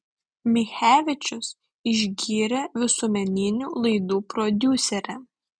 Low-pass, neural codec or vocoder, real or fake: 9.9 kHz; none; real